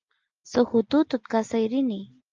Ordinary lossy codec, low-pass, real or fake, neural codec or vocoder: Opus, 32 kbps; 7.2 kHz; real; none